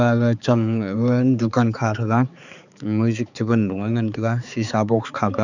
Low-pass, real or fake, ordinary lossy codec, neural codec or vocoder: 7.2 kHz; fake; none; codec, 16 kHz, 4 kbps, X-Codec, HuBERT features, trained on balanced general audio